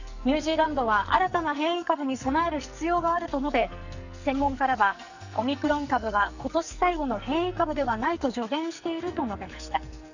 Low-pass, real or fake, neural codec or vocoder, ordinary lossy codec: 7.2 kHz; fake; codec, 44.1 kHz, 2.6 kbps, SNAC; none